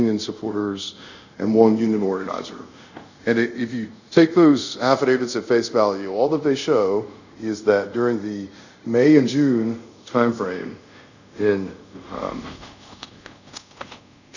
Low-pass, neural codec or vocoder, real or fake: 7.2 kHz; codec, 24 kHz, 0.5 kbps, DualCodec; fake